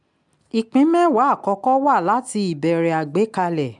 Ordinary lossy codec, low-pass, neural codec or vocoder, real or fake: MP3, 96 kbps; 10.8 kHz; none; real